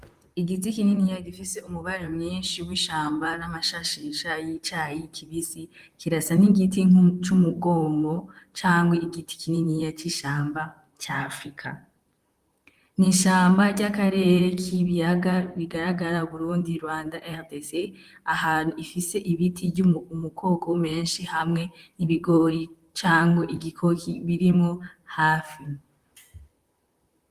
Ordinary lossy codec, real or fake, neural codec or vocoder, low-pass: Opus, 32 kbps; fake; vocoder, 44.1 kHz, 128 mel bands, Pupu-Vocoder; 14.4 kHz